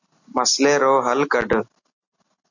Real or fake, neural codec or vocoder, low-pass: real; none; 7.2 kHz